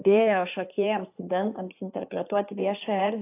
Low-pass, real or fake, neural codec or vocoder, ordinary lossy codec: 3.6 kHz; fake; codec, 16 kHz in and 24 kHz out, 2.2 kbps, FireRedTTS-2 codec; AAC, 24 kbps